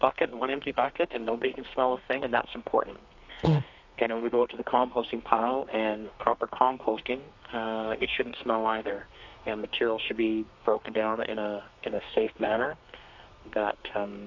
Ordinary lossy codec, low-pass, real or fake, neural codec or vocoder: AAC, 48 kbps; 7.2 kHz; fake; codec, 32 kHz, 1.9 kbps, SNAC